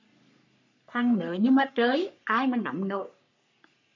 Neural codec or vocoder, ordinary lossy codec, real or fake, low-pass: codec, 44.1 kHz, 3.4 kbps, Pupu-Codec; MP3, 64 kbps; fake; 7.2 kHz